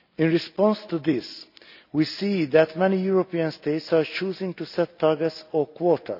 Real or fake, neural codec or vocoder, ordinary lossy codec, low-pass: real; none; none; 5.4 kHz